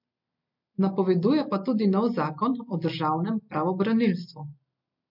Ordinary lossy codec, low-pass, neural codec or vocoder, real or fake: MP3, 48 kbps; 5.4 kHz; none; real